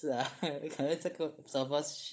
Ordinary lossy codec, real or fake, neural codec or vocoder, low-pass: none; fake; codec, 16 kHz, 16 kbps, FunCodec, trained on Chinese and English, 50 frames a second; none